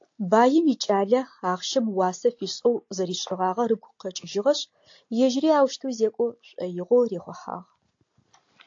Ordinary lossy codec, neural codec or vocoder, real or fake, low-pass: AAC, 48 kbps; none; real; 7.2 kHz